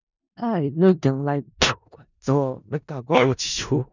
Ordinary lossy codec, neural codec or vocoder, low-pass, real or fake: none; codec, 16 kHz in and 24 kHz out, 0.4 kbps, LongCat-Audio-Codec, four codebook decoder; 7.2 kHz; fake